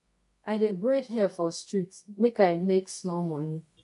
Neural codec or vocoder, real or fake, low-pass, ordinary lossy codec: codec, 24 kHz, 0.9 kbps, WavTokenizer, medium music audio release; fake; 10.8 kHz; AAC, 96 kbps